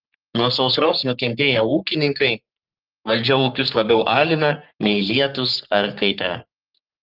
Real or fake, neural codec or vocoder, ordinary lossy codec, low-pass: fake; codec, 44.1 kHz, 3.4 kbps, Pupu-Codec; Opus, 32 kbps; 5.4 kHz